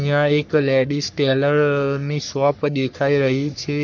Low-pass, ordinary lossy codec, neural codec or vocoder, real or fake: 7.2 kHz; none; codec, 44.1 kHz, 3.4 kbps, Pupu-Codec; fake